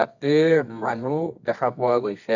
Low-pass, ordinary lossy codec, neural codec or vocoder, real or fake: 7.2 kHz; none; codec, 24 kHz, 0.9 kbps, WavTokenizer, medium music audio release; fake